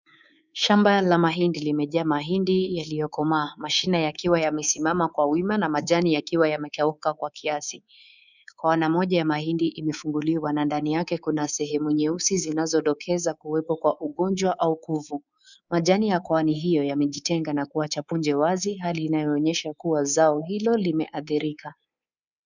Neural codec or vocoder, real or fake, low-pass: codec, 24 kHz, 3.1 kbps, DualCodec; fake; 7.2 kHz